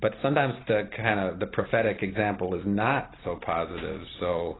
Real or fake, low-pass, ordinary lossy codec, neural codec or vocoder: real; 7.2 kHz; AAC, 16 kbps; none